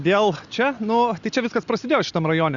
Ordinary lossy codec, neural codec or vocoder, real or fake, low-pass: Opus, 64 kbps; none; real; 7.2 kHz